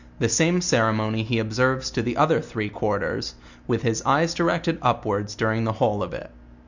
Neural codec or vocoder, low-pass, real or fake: none; 7.2 kHz; real